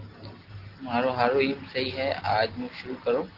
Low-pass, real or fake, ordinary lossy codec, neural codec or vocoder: 5.4 kHz; real; Opus, 16 kbps; none